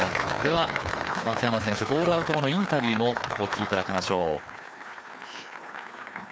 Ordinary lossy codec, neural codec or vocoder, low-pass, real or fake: none; codec, 16 kHz, 2 kbps, FreqCodec, larger model; none; fake